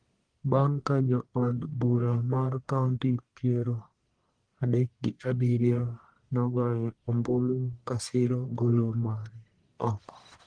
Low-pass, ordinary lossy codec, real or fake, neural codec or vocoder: 9.9 kHz; Opus, 24 kbps; fake; codec, 44.1 kHz, 1.7 kbps, Pupu-Codec